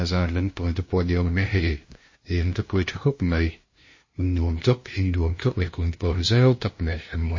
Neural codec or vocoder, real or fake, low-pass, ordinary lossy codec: codec, 16 kHz, 0.5 kbps, FunCodec, trained on LibriTTS, 25 frames a second; fake; 7.2 kHz; MP3, 32 kbps